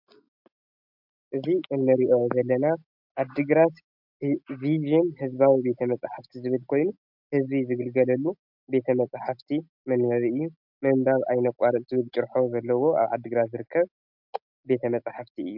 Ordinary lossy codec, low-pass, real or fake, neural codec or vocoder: MP3, 48 kbps; 5.4 kHz; real; none